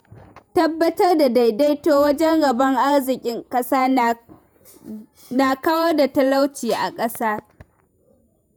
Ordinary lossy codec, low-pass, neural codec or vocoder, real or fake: none; none; vocoder, 48 kHz, 128 mel bands, Vocos; fake